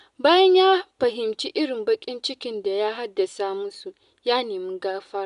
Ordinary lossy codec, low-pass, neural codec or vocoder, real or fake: none; 10.8 kHz; none; real